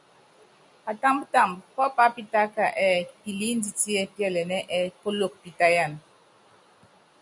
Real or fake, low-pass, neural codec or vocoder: real; 10.8 kHz; none